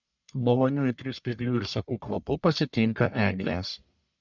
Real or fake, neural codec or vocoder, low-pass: fake; codec, 44.1 kHz, 1.7 kbps, Pupu-Codec; 7.2 kHz